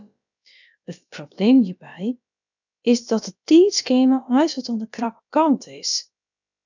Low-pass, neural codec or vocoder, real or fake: 7.2 kHz; codec, 16 kHz, about 1 kbps, DyCAST, with the encoder's durations; fake